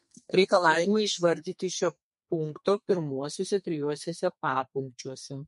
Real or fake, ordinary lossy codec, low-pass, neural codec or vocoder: fake; MP3, 48 kbps; 14.4 kHz; codec, 32 kHz, 1.9 kbps, SNAC